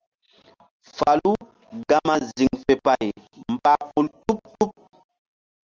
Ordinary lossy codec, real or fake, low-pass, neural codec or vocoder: Opus, 24 kbps; real; 7.2 kHz; none